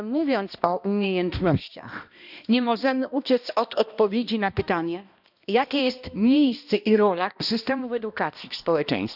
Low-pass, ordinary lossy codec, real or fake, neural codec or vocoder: 5.4 kHz; none; fake; codec, 16 kHz, 1 kbps, X-Codec, HuBERT features, trained on balanced general audio